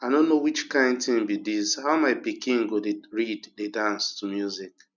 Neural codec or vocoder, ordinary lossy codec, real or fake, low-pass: none; none; real; 7.2 kHz